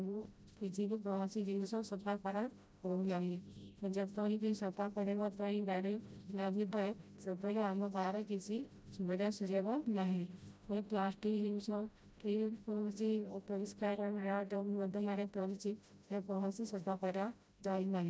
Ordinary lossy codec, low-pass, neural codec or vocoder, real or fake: none; none; codec, 16 kHz, 0.5 kbps, FreqCodec, smaller model; fake